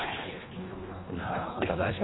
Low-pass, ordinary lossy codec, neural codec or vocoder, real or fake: 7.2 kHz; AAC, 16 kbps; codec, 24 kHz, 1.5 kbps, HILCodec; fake